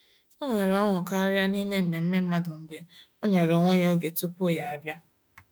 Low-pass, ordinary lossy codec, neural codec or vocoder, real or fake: none; none; autoencoder, 48 kHz, 32 numbers a frame, DAC-VAE, trained on Japanese speech; fake